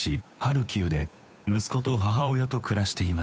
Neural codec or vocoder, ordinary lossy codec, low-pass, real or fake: codec, 16 kHz, 2 kbps, FunCodec, trained on Chinese and English, 25 frames a second; none; none; fake